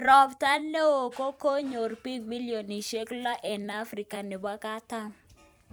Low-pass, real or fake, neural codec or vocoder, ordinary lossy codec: none; fake; vocoder, 44.1 kHz, 128 mel bands every 256 samples, BigVGAN v2; none